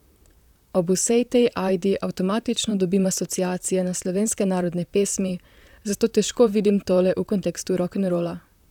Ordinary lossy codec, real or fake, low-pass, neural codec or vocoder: none; fake; 19.8 kHz; vocoder, 44.1 kHz, 128 mel bands, Pupu-Vocoder